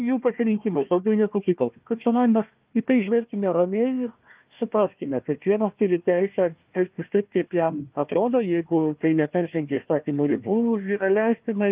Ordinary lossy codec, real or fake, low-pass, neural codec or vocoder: Opus, 24 kbps; fake; 3.6 kHz; codec, 16 kHz, 1 kbps, FunCodec, trained on Chinese and English, 50 frames a second